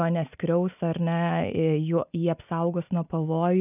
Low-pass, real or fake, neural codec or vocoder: 3.6 kHz; real; none